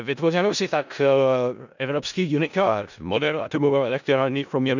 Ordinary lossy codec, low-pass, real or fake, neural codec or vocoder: none; 7.2 kHz; fake; codec, 16 kHz in and 24 kHz out, 0.4 kbps, LongCat-Audio-Codec, four codebook decoder